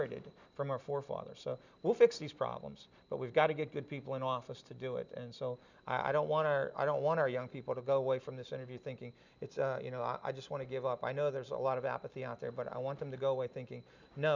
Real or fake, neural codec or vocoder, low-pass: real; none; 7.2 kHz